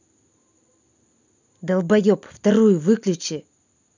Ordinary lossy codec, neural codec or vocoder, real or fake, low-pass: none; none; real; 7.2 kHz